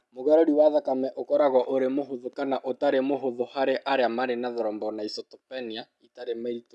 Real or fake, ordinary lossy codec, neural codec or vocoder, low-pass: real; none; none; none